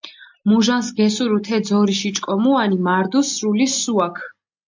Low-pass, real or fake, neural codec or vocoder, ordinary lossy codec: 7.2 kHz; real; none; MP3, 64 kbps